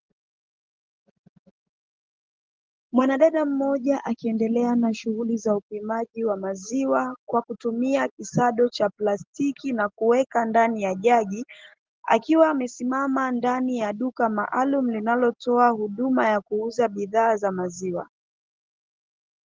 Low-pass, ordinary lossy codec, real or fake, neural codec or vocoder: 7.2 kHz; Opus, 16 kbps; real; none